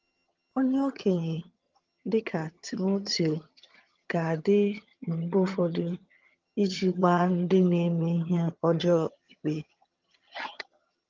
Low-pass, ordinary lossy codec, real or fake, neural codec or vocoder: 7.2 kHz; Opus, 24 kbps; fake; vocoder, 22.05 kHz, 80 mel bands, HiFi-GAN